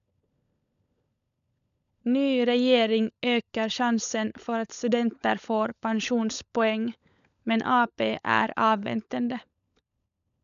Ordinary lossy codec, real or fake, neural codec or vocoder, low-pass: none; fake; codec, 16 kHz, 16 kbps, FunCodec, trained on LibriTTS, 50 frames a second; 7.2 kHz